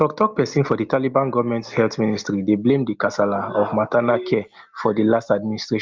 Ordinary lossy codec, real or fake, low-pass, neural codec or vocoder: Opus, 24 kbps; real; 7.2 kHz; none